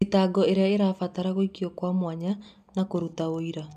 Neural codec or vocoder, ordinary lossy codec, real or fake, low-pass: none; none; real; 14.4 kHz